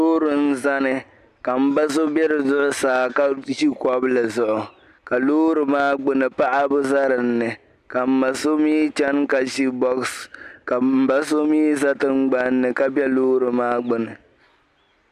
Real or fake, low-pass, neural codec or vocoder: real; 14.4 kHz; none